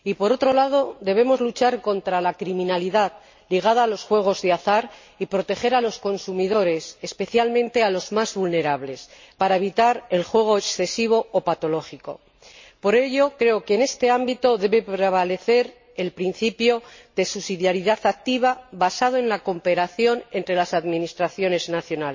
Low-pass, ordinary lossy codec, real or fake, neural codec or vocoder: 7.2 kHz; MP3, 32 kbps; real; none